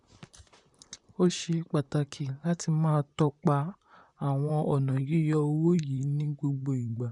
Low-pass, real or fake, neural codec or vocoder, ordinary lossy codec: 10.8 kHz; fake; vocoder, 44.1 kHz, 128 mel bands, Pupu-Vocoder; none